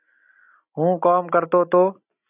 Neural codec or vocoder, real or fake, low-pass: none; real; 3.6 kHz